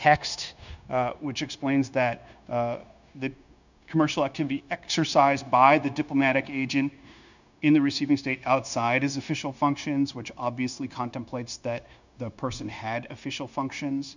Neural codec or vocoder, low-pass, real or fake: codec, 16 kHz, 0.9 kbps, LongCat-Audio-Codec; 7.2 kHz; fake